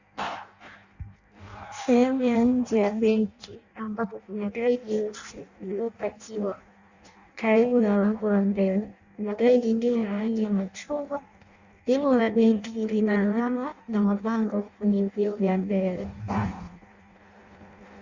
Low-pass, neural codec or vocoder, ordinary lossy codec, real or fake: 7.2 kHz; codec, 16 kHz in and 24 kHz out, 0.6 kbps, FireRedTTS-2 codec; Opus, 64 kbps; fake